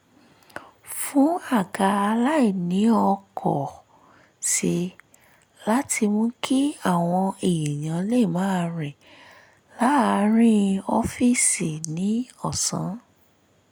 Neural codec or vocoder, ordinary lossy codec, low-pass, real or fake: none; none; none; real